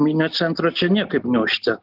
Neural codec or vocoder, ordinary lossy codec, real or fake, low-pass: none; Opus, 32 kbps; real; 5.4 kHz